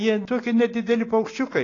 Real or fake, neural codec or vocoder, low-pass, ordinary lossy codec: real; none; 7.2 kHz; AAC, 32 kbps